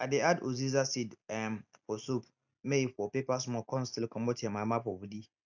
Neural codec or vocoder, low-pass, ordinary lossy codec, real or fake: none; 7.2 kHz; none; real